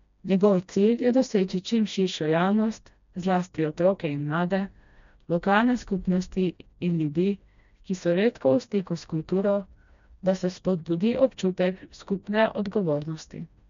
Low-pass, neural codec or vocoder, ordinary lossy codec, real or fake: 7.2 kHz; codec, 16 kHz, 1 kbps, FreqCodec, smaller model; MP3, 64 kbps; fake